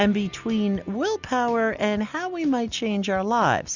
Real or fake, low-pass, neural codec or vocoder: real; 7.2 kHz; none